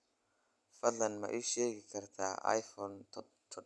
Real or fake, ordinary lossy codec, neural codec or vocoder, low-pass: real; none; none; none